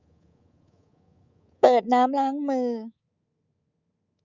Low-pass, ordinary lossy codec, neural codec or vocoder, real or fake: 7.2 kHz; Opus, 64 kbps; codec, 24 kHz, 3.1 kbps, DualCodec; fake